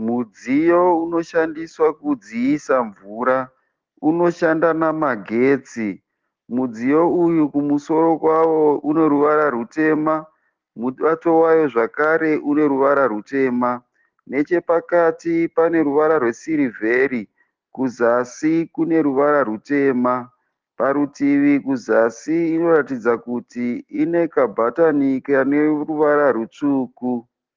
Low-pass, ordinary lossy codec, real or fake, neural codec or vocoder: 7.2 kHz; Opus, 16 kbps; real; none